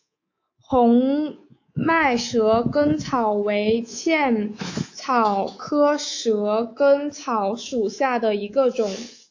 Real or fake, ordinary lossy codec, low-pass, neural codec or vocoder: fake; AAC, 48 kbps; 7.2 kHz; codec, 24 kHz, 3.1 kbps, DualCodec